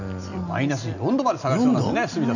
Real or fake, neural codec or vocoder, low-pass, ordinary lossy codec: real; none; 7.2 kHz; none